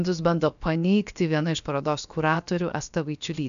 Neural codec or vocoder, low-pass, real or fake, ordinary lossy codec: codec, 16 kHz, about 1 kbps, DyCAST, with the encoder's durations; 7.2 kHz; fake; MP3, 96 kbps